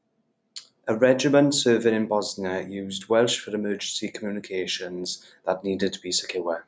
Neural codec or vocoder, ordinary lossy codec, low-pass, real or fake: none; none; none; real